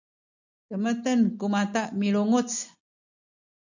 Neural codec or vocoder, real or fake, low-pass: none; real; 7.2 kHz